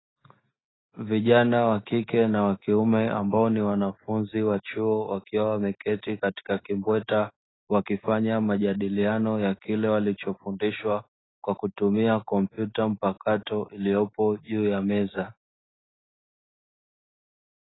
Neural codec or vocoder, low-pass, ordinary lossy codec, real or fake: none; 7.2 kHz; AAC, 16 kbps; real